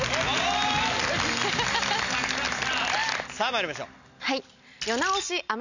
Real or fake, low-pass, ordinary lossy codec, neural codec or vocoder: real; 7.2 kHz; none; none